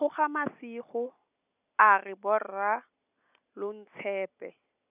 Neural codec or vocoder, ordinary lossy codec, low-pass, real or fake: none; none; 3.6 kHz; real